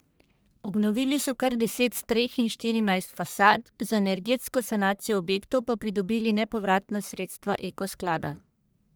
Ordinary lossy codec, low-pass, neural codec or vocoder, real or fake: none; none; codec, 44.1 kHz, 1.7 kbps, Pupu-Codec; fake